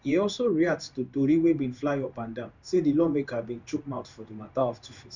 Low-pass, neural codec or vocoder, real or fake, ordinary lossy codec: 7.2 kHz; codec, 16 kHz in and 24 kHz out, 1 kbps, XY-Tokenizer; fake; none